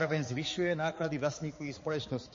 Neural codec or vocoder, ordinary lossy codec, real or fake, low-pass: codec, 16 kHz, 4 kbps, X-Codec, HuBERT features, trained on balanced general audio; MP3, 32 kbps; fake; 7.2 kHz